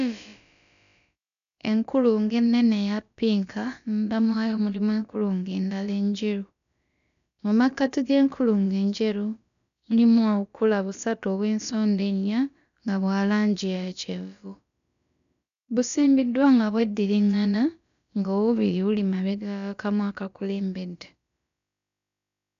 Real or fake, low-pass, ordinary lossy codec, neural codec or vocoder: fake; 7.2 kHz; none; codec, 16 kHz, about 1 kbps, DyCAST, with the encoder's durations